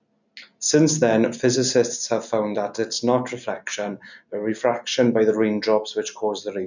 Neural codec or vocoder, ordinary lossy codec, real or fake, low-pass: none; none; real; 7.2 kHz